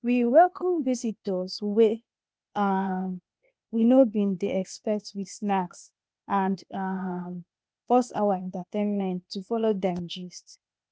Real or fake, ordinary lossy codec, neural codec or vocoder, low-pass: fake; none; codec, 16 kHz, 0.8 kbps, ZipCodec; none